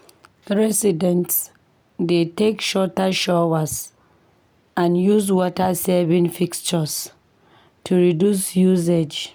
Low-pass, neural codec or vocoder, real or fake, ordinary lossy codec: none; none; real; none